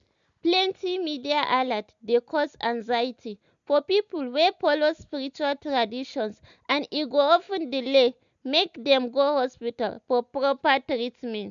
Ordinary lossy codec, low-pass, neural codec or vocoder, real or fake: none; 7.2 kHz; none; real